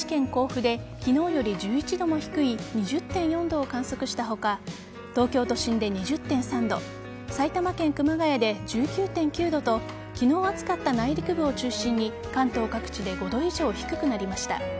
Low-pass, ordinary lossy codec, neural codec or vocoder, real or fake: none; none; none; real